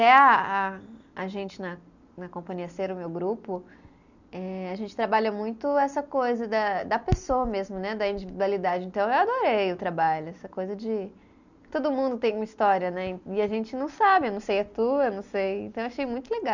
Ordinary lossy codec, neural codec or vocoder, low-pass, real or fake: none; none; 7.2 kHz; real